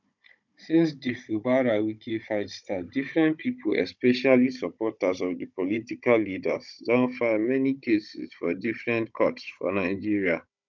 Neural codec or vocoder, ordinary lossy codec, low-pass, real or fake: codec, 16 kHz, 16 kbps, FunCodec, trained on Chinese and English, 50 frames a second; none; 7.2 kHz; fake